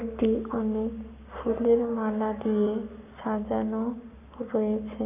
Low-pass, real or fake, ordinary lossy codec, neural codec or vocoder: 3.6 kHz; fake; none; codec, 44.1 kHz, 7.8 kbps, Pupu-Codec